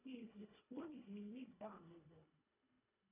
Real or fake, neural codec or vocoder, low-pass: fake; codec, 24 kHz, 1.5 kbps, HILCodec; 3.6 kHz